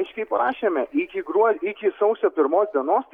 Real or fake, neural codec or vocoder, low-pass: real; none; 19.8 kHz